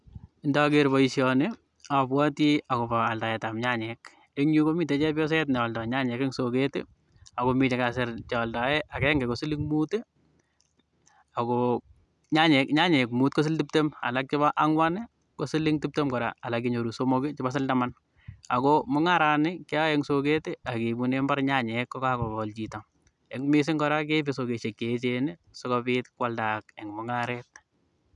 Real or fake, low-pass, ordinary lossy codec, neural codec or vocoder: real; 10.8 kHz; none; none